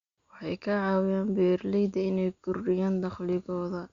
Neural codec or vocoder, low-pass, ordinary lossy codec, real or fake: none; 7.2 kHz; Opus, 64 kbps; real